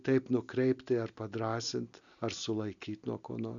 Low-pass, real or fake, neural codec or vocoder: 7.2 kHz; real; none